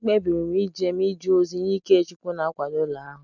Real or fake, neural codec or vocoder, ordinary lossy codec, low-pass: real; none; none; 7.2 kHz